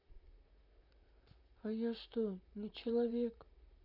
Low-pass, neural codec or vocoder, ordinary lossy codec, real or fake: 5.4 kHz; vocoder, 44.1 kHz, 128 mel bands, Pupu-Vocoder; none; fake